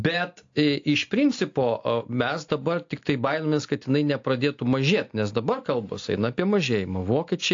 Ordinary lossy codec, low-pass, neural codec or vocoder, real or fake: AAC, 48 kbps; 7.2 kHz; none; real